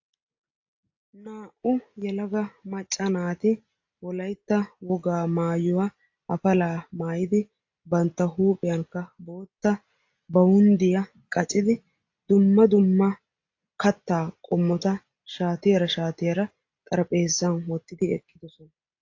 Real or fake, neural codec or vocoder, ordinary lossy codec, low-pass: real; none; AAC, 48 kbps; 7.2 kHz